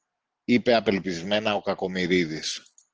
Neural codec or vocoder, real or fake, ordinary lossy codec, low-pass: none; real; Opus, 16 kbps; 7.2 kHz